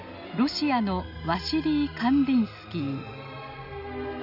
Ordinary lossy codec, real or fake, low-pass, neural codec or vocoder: none; real; 5.4 kHz; none